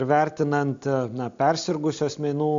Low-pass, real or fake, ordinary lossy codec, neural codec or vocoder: 7.2 kHz; real; MP3, 64 kbps; none